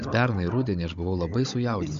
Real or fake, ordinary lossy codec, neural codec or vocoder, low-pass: fake; MP3, 48 kbps; codec, 16 kHz, 16 kbps, FunCodec, trained on Chinese and English, 50 frames a second; 7.2 kHz